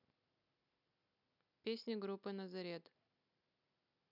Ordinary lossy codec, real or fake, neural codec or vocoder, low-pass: none; real; none; 5.4 kHz